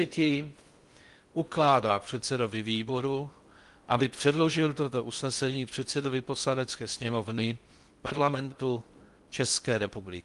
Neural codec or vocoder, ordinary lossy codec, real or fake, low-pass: codec, 16 kHz in and 24 kHz out, 0.6 kbps, FocalCodec, streaming, 2048 codes; Opus, 32 kbps; fake; 10.8 kHz